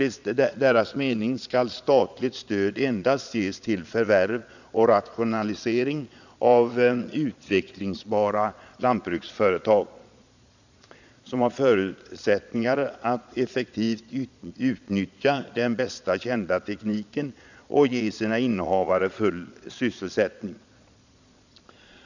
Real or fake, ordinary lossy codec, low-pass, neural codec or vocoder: fake; none; 7.2 kHz; vocoder, 22.05 kHz, 80 mel bands, Vocos